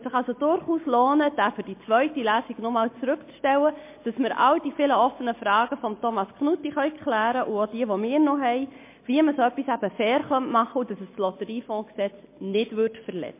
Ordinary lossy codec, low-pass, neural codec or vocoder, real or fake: MP3, 24 kbps; 3.6 kHz; none; real